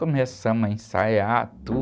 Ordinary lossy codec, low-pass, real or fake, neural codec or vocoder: none; none; real; none